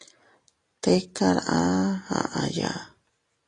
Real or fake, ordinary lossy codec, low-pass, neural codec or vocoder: real; AAC, 32 kbps; 10.8 kHz; none